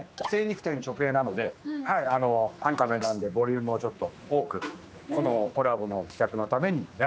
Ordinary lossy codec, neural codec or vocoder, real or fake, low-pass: none; codec, 16 kHz, 2 kbps, X-Codec, HuBERT features, trained on general audio; fake; none